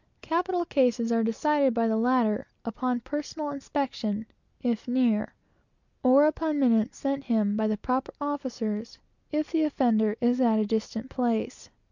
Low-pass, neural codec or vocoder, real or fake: 7.2 kHz; none; real